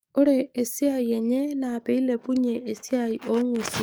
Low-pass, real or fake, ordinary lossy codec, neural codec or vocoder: none; fake; none; codec, 44.1 kHz, 7.8 kbps, DAC